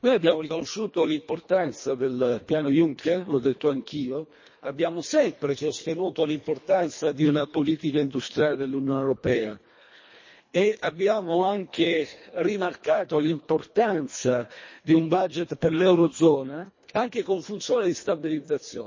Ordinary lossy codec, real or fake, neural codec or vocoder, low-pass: MP3, 32 kbps; fake; codec, 24 kHz, 1.5 kbps, HILCodec; 7.2 kHz